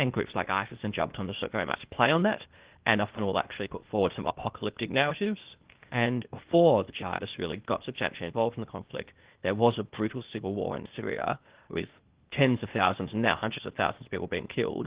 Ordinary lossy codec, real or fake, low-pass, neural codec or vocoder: Opus, 16 kbps; fake; 3.6 kHz; codec, 16 kHz, 0.8 kbps, ZipCodec